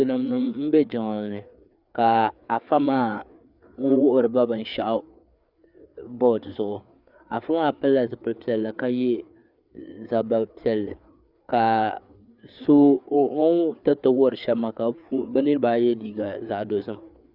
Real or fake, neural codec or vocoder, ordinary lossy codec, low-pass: fake; codec, 16 kHz, 4 kbps, FreqCodec, larger model; Opus, 64 kbps; 5.4 kHz